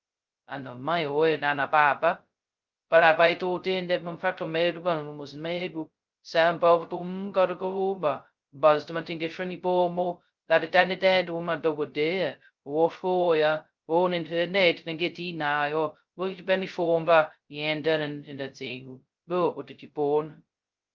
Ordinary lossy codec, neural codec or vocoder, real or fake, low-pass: Opus, 24 kbps; codec, 16 kHz, 0.2 kbps, FocalCodec; fake; 7.2 kHz